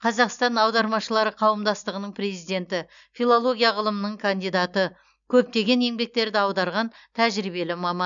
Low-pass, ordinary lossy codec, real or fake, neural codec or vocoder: 7.2 kHz; none; real; none